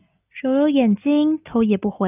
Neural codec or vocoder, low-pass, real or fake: none; 3.6 kHz; real